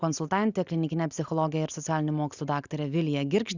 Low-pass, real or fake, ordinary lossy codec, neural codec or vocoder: 7.2 kHz; real; Opus, 64 kbps; none